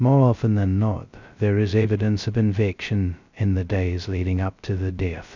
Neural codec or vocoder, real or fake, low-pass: codec, 16 kHz, 0.2 kbps, FocalCodec; fake; 7.2 kHz